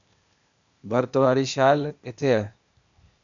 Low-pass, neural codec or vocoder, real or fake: 7.2 kHz; codec, 16 kHz, 0.8 kbps, ZipCodec; fake